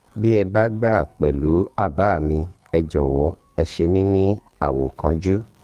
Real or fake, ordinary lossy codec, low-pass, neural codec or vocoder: fake; Opus, 32 kbps; 14.4 kHz; codec, 44.1 kHz, 2.6 kbps, SNAC